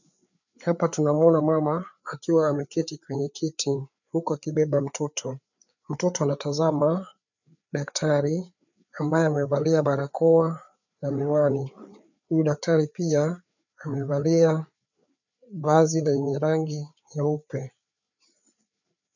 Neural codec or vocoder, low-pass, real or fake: codec, 16 kHz, 4 kbps, FreqCodec, larger model; 7.2 kHz; fake